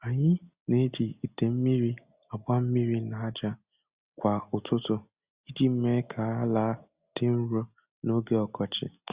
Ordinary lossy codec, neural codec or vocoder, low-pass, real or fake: Opus, 64 kbps; none; 3.6 kHz; real